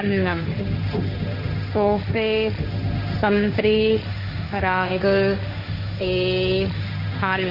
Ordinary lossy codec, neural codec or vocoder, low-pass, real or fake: Opus, 64 kbps; codec, 16 kHz, 1.1 kbps, Voila-Tokenizer; 5.4 kHz; fake